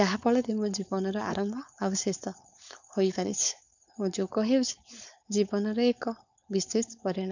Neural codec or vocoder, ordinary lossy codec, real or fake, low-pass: codec, 16 kHz, 4.8 kbps, FACodec; none; fake; 7.2 kHz